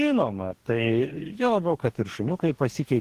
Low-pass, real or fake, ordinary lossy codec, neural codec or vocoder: 14.4 kHz; fake; Opus, 16 kbps; codec, 44.1 kHz, 2.6 kbps, DAC